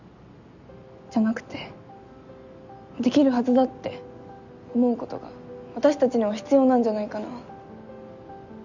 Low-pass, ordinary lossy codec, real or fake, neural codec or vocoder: 7.2 kHz; none; real; none